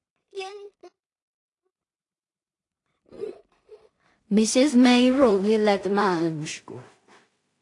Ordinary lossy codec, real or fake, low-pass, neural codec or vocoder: AAC, 48 kbps; fake; 10.8 kHz; codec, 16 kHz in and 24 kHz out, 0.4 kbps, LongCat-Audio-Codec, two codebook decoder